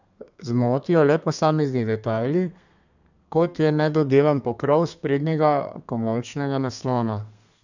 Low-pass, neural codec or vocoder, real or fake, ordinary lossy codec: 7.2 kHz; codec, 32 kHz, 1.9 kbps, SNAC; fake; none